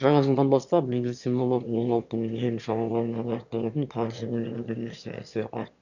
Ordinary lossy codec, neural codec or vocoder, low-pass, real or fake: none; autoencoder, 22.05 kHz, a latent of 192 numbers a frame, VITS, trained on one speaker; 7.2 kHz; fake